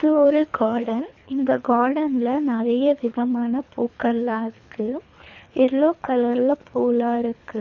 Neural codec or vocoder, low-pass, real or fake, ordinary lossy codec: codec, 24 kHz, 3 kbps, HILCodec; 7.2 kHz; fake; none